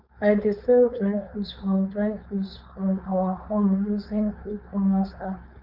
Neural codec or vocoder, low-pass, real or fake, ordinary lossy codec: codec, 16 kHz, 4.8 kbps, FACodec; 5.4 kHz; fake; none